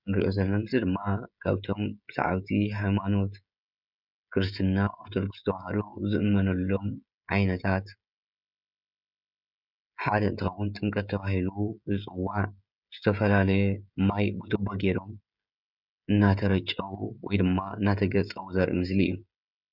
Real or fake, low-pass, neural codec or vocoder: fake; 5.4 kHz; codec, 16 kHz, 16 kbps, FreqCodec, smaller model